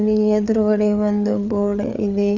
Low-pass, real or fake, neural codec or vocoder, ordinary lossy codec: 7.2 kHz; fake; codec, 16 kHz, 4 kbps, FreqCodec, larger model; none